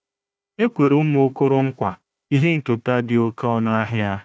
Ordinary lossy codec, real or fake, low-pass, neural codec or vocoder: none; fake; none; codec, 16 kHz, 1 kbps, FunCodec, trained on Chinese and English, 50 frames a second